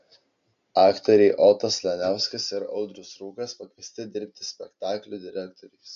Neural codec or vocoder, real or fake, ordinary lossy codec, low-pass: none; real; MP3, 64 kbps; 7.2 kHz